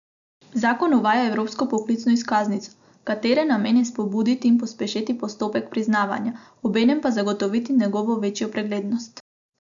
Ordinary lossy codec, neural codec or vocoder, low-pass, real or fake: none; none; 7.2 kHz; real